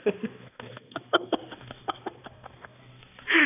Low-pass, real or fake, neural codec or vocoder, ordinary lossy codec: 3.6 kHz; fake; codec, 16 kHz, 2 kbps, X-Codec, HuBERT features, trained on balanced general audio; none